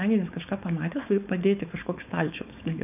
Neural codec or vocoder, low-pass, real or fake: codec, 16 kHz, 4.8 kbps, FACodec; 3.6 kHz; fake